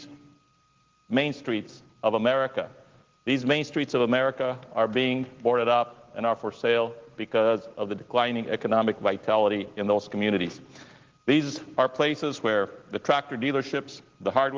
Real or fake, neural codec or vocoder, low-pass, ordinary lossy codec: real; none; 7.2 kHz; Opus, 16 kbps